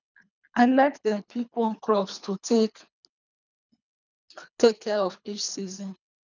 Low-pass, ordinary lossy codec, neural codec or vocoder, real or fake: 7.2 kHz; none; codec, 24 kHz, 3 kbps, HILCodec; fake